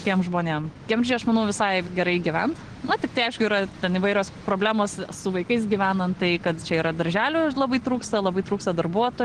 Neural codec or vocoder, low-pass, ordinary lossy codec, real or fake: none; 9.9 kHz; Opus, 16 kbps; real